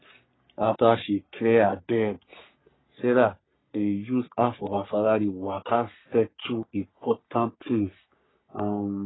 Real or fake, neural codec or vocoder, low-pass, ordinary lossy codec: fake; codec, 44.1 kHz, 3.4 kbps, Pupu-Codec; 7.2 kHz; AAC, 16 kbps